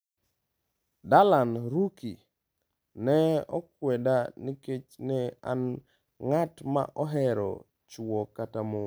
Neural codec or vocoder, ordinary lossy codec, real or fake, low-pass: none; none; real; none